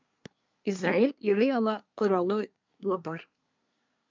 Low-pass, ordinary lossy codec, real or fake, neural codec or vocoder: 7.2 kHz; MP3, 64 kbps; fake; codec, 24 kHz, 1 kbps, SNAC